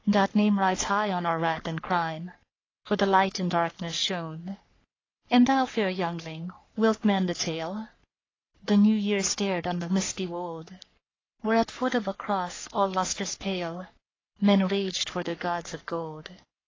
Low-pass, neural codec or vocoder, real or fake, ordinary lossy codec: 7.2 kHz; codec, 44.1 kHz, 3.4 kbps, Pupu-Codec; fake; AAC, 32 kbps